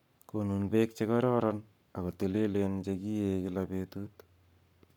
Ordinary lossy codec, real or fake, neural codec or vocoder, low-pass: none; fake; codec, 44.1 kHz, 7.8 kbps, Pupu-Codec; 19.8 kHz